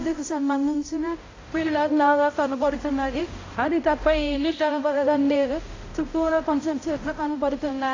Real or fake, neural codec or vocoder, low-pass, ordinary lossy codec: fake; codec, 16 kHz, 0.5 kbps, X-Codec, HuBERT features, trained on balanced general audio; 7.2 kHz; AAC, 32 kbps